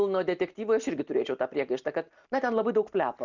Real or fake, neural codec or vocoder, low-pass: real; none; 7.2 kHz